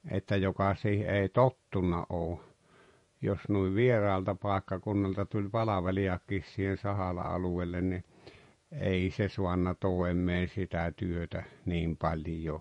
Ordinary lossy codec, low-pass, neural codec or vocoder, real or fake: MP3, 48 kbps; 14.4 kHz; vocoder, 48 kHz, 128 mel bands, Vocos; fake